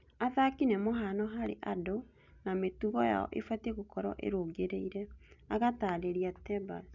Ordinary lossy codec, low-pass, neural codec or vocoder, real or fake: none; 7.2 kHz; none; real